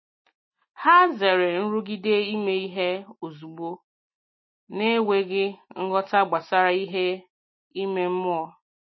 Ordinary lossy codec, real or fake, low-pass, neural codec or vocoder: MP3, 24 kbps; real; 7.2 kHz; none